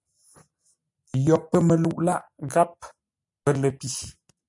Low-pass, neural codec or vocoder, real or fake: 10.8 kHz; none; real